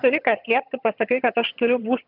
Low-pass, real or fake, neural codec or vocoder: 5.4 kHz; fake; vocoder, 22.05 kHz, 80 mel bands, HiFi-GAN